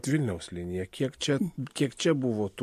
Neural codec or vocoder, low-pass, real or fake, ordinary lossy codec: none; 14.4 kHz; real; MP3, 64 kbps